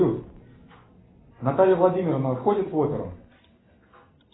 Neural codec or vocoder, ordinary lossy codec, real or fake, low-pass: none; AAC, 16 kbps; real; 7.2 kHz